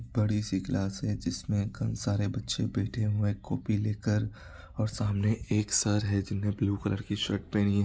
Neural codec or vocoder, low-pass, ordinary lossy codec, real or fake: none; none; none; real